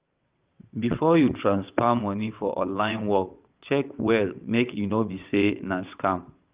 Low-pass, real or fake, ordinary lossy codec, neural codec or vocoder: 3.6 kHz; fake; Opus, 32 kbps; vocoder, 22.05 kHz, 80 mel bands, WaveNeXt